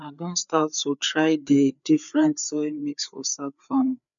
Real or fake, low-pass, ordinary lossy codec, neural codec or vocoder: fake; 7.2 kHz; none; codec, 16 kHz, 4 kbps, FreqCodec, larger model